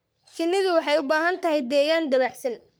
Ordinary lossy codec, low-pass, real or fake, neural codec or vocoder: none; none; fake; codec, 44.1 kHz, 3.4 kbps, Pupu-Codec